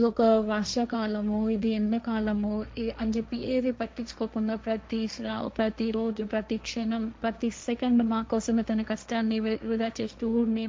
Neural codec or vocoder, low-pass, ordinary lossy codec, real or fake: codec, 16 kHz, 1.1 kbps, Voila-Tokenizer; none; none; fake